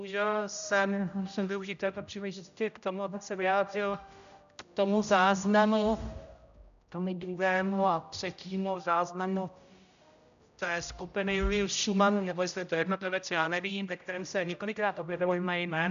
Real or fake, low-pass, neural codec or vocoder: fake; 7.2 kHz; codec, 16 kHz, 0.5 kbps, X-Codec, HuBERT features, trained on general audio